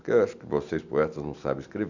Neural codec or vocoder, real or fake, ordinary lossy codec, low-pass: none; real; none; 7.2 kHz